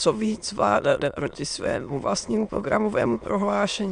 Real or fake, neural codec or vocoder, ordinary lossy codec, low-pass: fake; autoencoder, 22.05 kHz, a latent of 192 numbers a frame, VITS, trained on many speakers; Opus, 64 kbps; 9.9 kHz